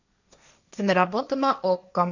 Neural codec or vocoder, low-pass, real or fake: codec, 16 kHz, 1.1 kbps, Voila-Tokenizer; 7.2 kHz; fake